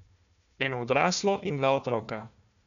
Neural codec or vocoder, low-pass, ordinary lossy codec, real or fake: codec, 16 kHz, 1 kbps, FunCodec, trained on Chinese and English, 50 frames a second; 7.2 kHz; none; fake